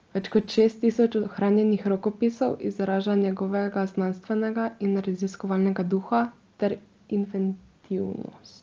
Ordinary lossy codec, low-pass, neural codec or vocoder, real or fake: Opus, 24 kbps; 7.2 kHz; none; real